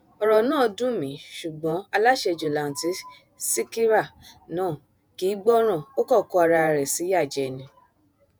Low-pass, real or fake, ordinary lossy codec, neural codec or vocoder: none; fake; none; vocoder, 48 kHz, 128 mel bands, Vocos